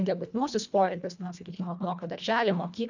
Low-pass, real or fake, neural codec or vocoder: 7.2 kHz; fake; codec, 24 kHz, 1.5 kbps, HILCodec